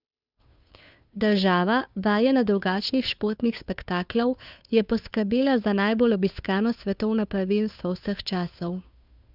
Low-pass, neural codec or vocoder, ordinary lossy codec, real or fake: 5.4 kHz; codec, 16 kHz, 2 kbps, FunCodec, trained on Chinese and English, 25 frames a second; none; fake